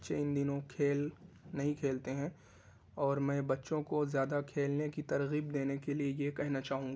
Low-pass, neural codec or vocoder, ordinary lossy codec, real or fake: none; none; none; real